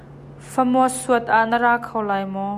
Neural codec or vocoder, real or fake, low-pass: none; real; 14.4 kHz